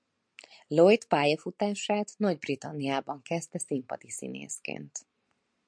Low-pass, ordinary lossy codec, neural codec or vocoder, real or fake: 9.9 kHz; MP3, 64 kbps; none; real